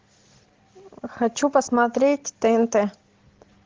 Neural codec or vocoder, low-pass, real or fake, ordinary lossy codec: none; 7.2 kHz; real; Opus, 16 kbps